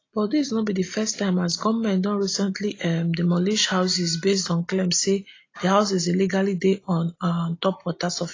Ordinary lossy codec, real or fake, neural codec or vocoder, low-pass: AAC, 32 kbps; real; none; 7.2 kHz